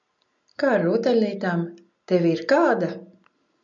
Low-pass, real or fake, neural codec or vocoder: 7.2 kHz; real; none